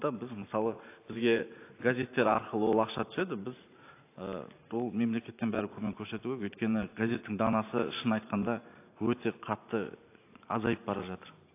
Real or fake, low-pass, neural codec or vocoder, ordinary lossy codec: fake; 3.6 kHz; vocoder, 44.1 kHz, 128 mel bands every 512 samples, BigVGAN v2; AAC, 24 kbps